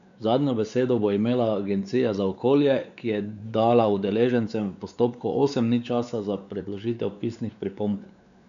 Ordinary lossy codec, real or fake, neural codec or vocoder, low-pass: MP3, 96 kbps; fake; codec, 16 kHz, 4 kbps, X-Codec, WavLM features, trained on Multilingual LibriSpeech; 7.2 kHz